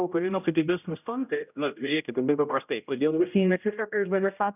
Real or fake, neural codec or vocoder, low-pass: fake; codec, 16 kHz, 0.5 kbps, X-Codec, HuBERT features, trained on general audio; 3.6 kHz